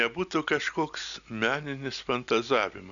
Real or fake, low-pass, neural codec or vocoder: real; 7.2 kHz; none